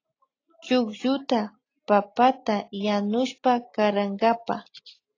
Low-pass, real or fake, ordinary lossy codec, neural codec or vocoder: 7.2 kHz; real; AAC, 32 kbps; none